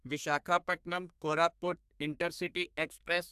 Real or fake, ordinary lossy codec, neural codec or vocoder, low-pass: fake; AAC, 96 kbps; codec, 32 kHz, 1.9 kbps, SNAC; 14.4 kHz